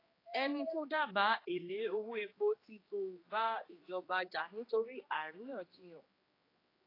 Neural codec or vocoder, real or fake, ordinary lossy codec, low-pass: codec, 16 kHz, 2 kbps, X-Codec, HuBERT features, trained on general audio; fake; AAC, 24 kbps; 5.4 kHz